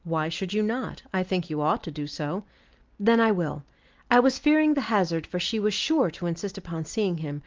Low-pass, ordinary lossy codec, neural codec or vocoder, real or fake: 7.2 kHz; Opus, 32 kbps; none; real